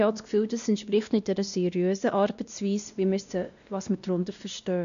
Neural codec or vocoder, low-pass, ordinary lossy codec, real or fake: codec, 16 kHz, 1 kbps, X-Codec, WavLM features, trained on Multilingual LibriSpeech; 7.2 kHz; none; fake